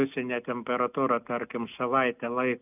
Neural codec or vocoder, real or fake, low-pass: none; real; 3.6 kHz